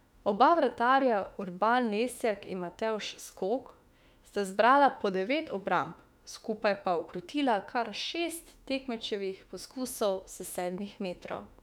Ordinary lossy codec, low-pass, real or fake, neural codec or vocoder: none; 19.8 kHz; fake; autoencoder, 48 kHz, 32 numbers a frame, DAC-VAE, trained on Japanese speech